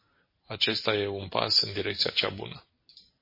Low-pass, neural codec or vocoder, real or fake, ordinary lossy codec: 5.4 kHz; none; real; MP3, 24 kbps